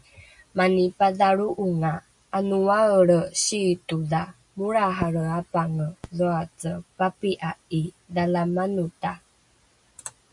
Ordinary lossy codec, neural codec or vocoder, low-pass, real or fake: MP3, 96 kbps; none; 10.8 kHz; real